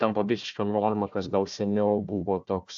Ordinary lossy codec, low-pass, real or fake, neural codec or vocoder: AAC, 64 kbps; 7.2 kHz; fake; codec, 16 kHz, 1 kbps, FunCodec, trained on Chinese and English, 50 frames a second